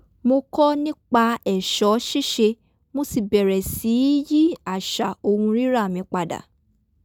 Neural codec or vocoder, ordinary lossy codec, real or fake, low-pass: none; none; real; none